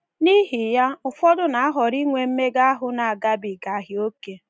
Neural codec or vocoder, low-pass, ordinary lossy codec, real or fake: none; none; none; real